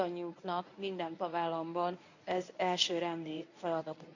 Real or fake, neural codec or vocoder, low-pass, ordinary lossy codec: fake; codec, 24 kHz, 0.9 kbps, WavTokenizer, medium speech release version 1; 7.2 kHz; none